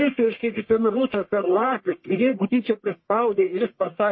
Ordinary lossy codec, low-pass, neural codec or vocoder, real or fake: MP3, 24 kbps; 7.2 kHz; codec, 44.1 kHz, 1.7 kbps, Pupu-Codec; fake